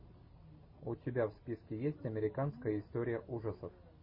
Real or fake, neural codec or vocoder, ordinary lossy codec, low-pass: real; none; MP3, 24 kbps; 5.4 kHz